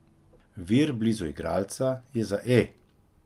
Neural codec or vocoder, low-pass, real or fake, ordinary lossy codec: none; 14.4 kHz; real; Opus, 32 kbps